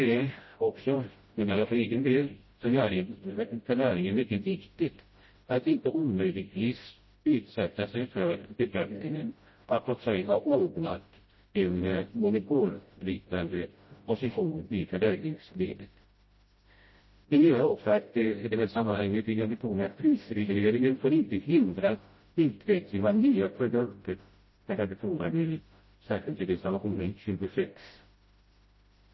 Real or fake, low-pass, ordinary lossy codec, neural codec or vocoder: fake; 7.2 kHz; MP3, 24 kbps; codec, 16 kHz, 0.5 kbps, FreqCodec, smaller model